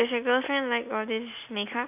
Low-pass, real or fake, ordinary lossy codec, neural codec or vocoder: 3.6 kHz; real; none; none